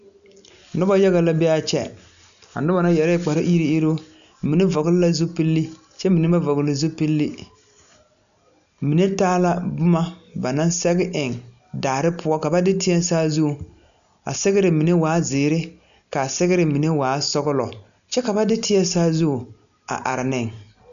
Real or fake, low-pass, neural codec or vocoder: real; 7.2 kHz; none